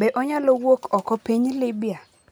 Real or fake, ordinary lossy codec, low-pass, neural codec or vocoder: real; none; none; none